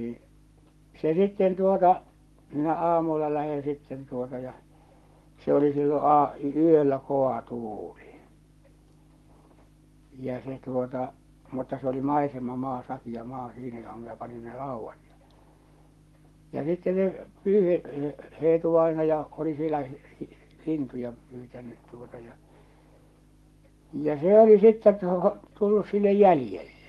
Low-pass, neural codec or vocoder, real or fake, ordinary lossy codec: 19.8 kHz; codec, 44.1 kHz, 7.8 kbps, Pupu-Codec; fake; Opus, 24 kbps